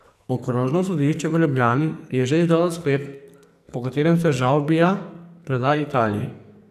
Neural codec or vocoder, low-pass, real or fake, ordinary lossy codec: codec, 44.1 kHz, 2.6 kbps, SNAC; 14.4 kHz; fake; none